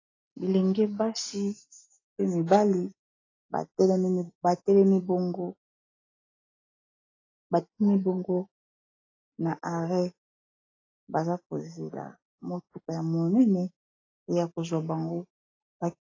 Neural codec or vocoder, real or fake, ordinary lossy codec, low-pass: none; real; AAC, 32 kbps; 7.2 kHz